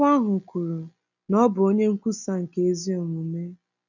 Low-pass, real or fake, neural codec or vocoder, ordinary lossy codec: 7.2 kHz; real; none; none